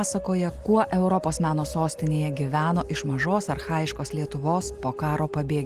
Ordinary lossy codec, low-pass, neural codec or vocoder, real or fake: Opus, 24 kbps; 14.4 kHz; none; real